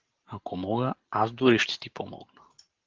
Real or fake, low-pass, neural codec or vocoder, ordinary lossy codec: real; 7.2 kHz; none; Opus, 16 kbps